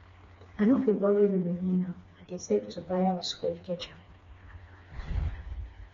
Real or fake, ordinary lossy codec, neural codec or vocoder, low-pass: fake; AAC, 32 kbps; codec, 16 kHz, 2 kbps, FreqCodec, smaller model; 7.2 kHz